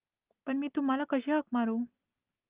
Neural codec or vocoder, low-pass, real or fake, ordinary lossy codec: none; 3.6 kHz; real; Opus, 24 kbps